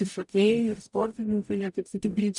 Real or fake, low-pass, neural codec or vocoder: fake; 10.8 kHz; codec, 44.1 kHz, 0.9 kbps, DAC